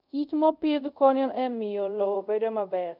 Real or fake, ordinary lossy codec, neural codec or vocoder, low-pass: fake; none; codec, 24 kHz, 0.5 kbps, DualCodec; 5.4 kHz